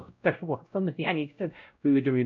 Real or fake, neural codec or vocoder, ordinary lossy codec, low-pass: fake; codec, 16 kHz, 0.5 kbps, X-Codec, WavLM features, trained on Multilingual LibriSpeech; none; 7.2 kHz